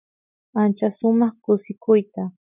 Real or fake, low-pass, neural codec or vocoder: real; 3.6 kHz; none